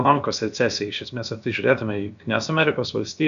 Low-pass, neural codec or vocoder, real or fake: 7.2 kHz; codec, 16 kHz, about 1 kbps, DyCAST, with the encoder's durations; fake